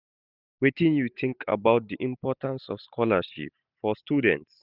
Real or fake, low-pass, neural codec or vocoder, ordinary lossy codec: real; 5.4 kHz; none; none